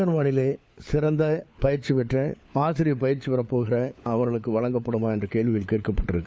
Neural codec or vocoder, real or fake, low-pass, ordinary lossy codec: codec, 16 kHz, 4 kbps, FunCodec, trained on LibriTTS, 50 frames a second; fake; none; none